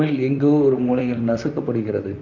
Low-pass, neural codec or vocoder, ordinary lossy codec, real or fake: 7.2 kHz; vocoder, 44.1 kHz, 128 mel bands, Pupu-Vocoder; MP3, 48 kbps; fake